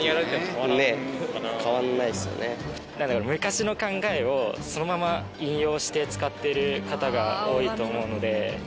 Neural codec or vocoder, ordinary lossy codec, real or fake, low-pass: none; none; real; none